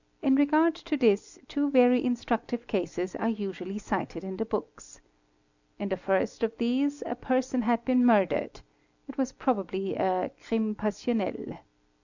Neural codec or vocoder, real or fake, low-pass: none; real; 7.2 kHz